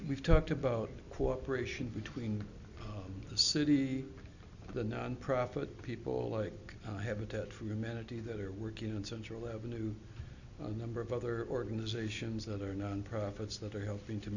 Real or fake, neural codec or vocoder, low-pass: real; none; 7.2 kHz